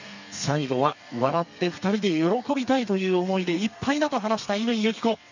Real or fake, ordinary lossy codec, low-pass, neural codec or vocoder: fake; none; 7.2 kHz; codec, 32 kHz, 1.9 kbps, SNAC